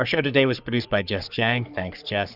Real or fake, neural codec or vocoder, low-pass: fake; codec, 44.1 kHz, 3.4 kbps, Pupu-Codec; 5.4 kHz